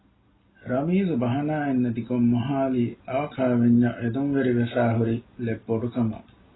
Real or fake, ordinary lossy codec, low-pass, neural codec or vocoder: real; AAC, 16 kbps; 7.2 kHz; none